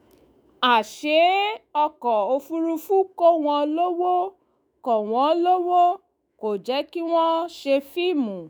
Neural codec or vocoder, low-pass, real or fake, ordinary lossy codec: autoencoder, 48 kHz, 128 numbers a frame, DAC-VAE, trained on Japanese speech; none; fake; none